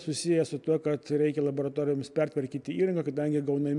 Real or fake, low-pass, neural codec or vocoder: real; 10.8 kHz; none